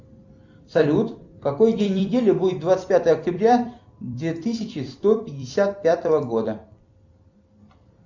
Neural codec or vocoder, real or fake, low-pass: vocoder, 44.1 kHz, 128 mel bands every 256 samples, BigVGAN v2; fake; 7.2 kHz